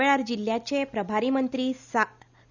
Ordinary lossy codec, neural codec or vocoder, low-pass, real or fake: none; none; 7.2 kHz; real